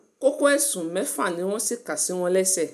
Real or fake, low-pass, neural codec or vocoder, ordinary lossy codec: real; 14.4 kHz; none; MP3, 96 kbps